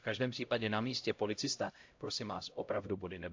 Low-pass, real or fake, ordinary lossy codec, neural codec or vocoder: 7.2 kHz; fake; MP3, 48 kbps; codec, 16 kHz, 0.5 kbps, X-Codec, HuBERT features, trained on LibriSpeech